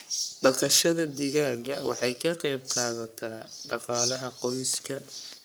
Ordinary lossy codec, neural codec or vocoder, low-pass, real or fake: none; codec, 44.1 kHz, 3.4 kbps, Pupu-Codec; none; fake